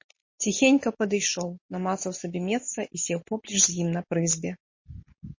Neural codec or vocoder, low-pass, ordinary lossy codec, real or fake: none; 7.2 kHz; MP3, 32 kbps; real